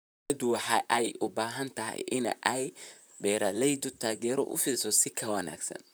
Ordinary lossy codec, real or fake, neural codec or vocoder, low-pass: none; fake; vocoder, 44.1 kHz, 128 mel bands, Pupu-Vocoder; none